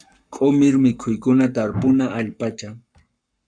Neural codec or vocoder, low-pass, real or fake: codec, 44.1 kHz, 7.8 kbps, Pupu-Codec; 9.9 kHz; fake